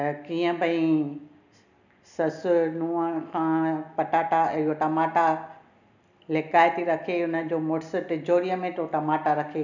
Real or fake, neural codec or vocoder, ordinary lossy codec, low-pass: real; none; none; 7.2 kHz